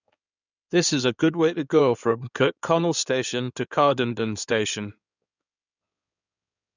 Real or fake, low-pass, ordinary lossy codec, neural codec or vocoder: fake; 7.2 kHz; none; codec, 16 kHz in and 24 kHz out, 2.2 kbps, FireRedTTS-2 codec